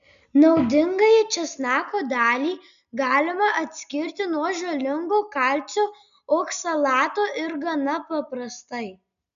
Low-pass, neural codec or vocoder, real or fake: 7.2 kHz; none; real